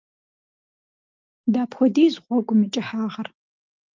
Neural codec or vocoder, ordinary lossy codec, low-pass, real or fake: none; Opus, 32 kbps; 7.2 kHz; real